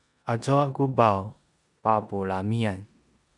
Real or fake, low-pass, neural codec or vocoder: fake; 10.8 kHz; codec, 16 kHz in and 24 kHz out, 0.9 kbps, LongCat-Audio-Codec, four codebook decoder